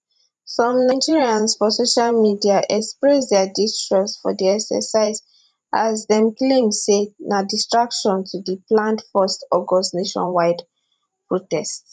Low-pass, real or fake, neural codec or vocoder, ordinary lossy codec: 10.8 kHz; fake; vocoder, 44.1 kHz, 128 mel bands every 512 samples, BigVGAN v2; none